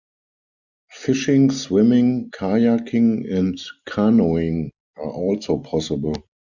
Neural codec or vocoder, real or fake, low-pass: none; real; 7.2 kHz